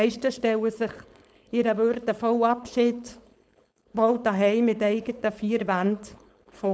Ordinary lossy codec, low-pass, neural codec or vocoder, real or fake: none; none; codec, 16 kHz, 4.8 kbps, FACodec; fake